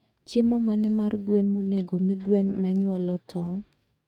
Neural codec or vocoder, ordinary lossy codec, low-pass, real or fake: codec, 44.1 kHz, 2.6 kbps, DAC; MP3, 96 kbps; 19.8 kHz; fake